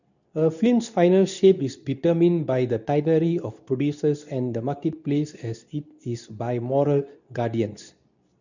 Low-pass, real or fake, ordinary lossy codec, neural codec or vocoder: 7.2 kHz; fake; none; codec, 24 kHz, 0.9 kbps, WavTokenizer, medium speech release version 2